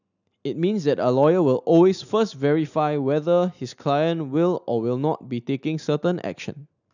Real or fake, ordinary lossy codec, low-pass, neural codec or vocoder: real; none; 7.2 kHz; none